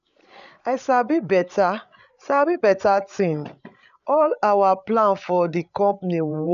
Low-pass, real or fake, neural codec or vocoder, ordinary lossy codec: 7.2 kHz; fake; codec, 16 kHz, 8 kbps, FreqCodec, larger model; none